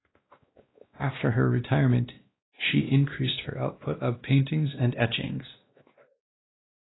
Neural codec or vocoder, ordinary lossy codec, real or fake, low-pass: codec, 16 kHz, 1 kbps, X-Codec, WavLM features, trained on Multilingual LibriSpeech; AAC, 16 kbps; fake; 7.2 kHz